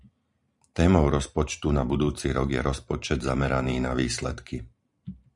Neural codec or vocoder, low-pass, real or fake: vocoder, 44.1 kHz, 128 mel bands every 512 samples, BigVGAN v2; 10.8 kHz; fake